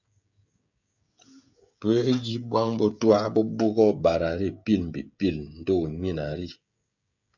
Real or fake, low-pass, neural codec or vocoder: fake; 7.2 kHz; codec, 16 kHz, 16 kbps, FreqCodec, smaller model